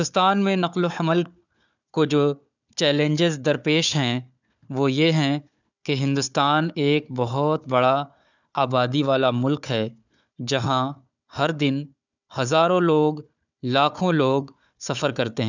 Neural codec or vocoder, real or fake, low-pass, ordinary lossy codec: codec, 16 kHz, 4 kbps, FunCodec, trained on Chinese and English, 50 frames a second; fake; 7.2 kHz; none